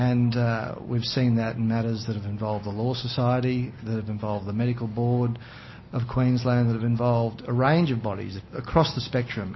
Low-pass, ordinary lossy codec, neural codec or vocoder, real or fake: 7.2 kHz; MP3, 24 kbps; none; real